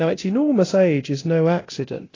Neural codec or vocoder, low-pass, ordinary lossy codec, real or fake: codec, 24 kHz, 0.9 kbps, DualCodec; 7.2 kHz; AAC, 32 kbps; fake